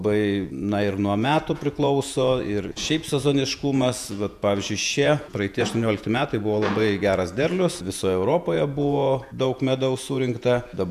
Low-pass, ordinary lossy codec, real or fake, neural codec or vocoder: 14.4 kHz; MP3, 96 kbps; fake; vocoder, 48 kHz, 128 mel bands, Vocos